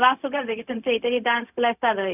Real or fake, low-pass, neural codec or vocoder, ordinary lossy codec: fake; 3.6 kHz; codec, 16 kHz, 0.4 kbps, LongCat-Audio-Codec; none